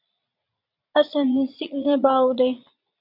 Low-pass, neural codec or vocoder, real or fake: 5.4 kHz; vocoder, 22.05 kHz, 80 mel bands, Vocos; fake